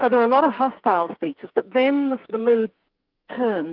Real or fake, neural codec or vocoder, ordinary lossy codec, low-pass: fake; codec, 44.1 kHz, 2.6 kbps, SNAC; Opus, 32 kbps; 5.4 kHz